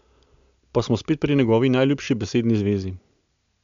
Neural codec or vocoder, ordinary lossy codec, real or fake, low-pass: none; MP3, 64 kbps; real; 7.2 kHz